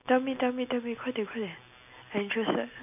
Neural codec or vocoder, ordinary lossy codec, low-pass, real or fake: none; none; 3.6 kHz; real